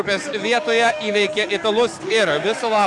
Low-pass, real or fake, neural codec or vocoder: 10.8 kHz; fake; codec, 44.1 kHz, 7.8 kbps, DAC